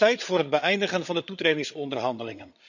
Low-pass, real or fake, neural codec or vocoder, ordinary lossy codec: 7.2 kHz; fake; codec, 16 kHz, 8 kbps, FreqCodec, larger model; none